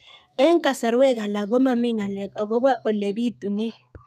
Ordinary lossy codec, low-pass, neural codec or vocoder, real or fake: none; 14.4 kHz; codec, 32 kHz, 1.9 kbps, SNAC; fake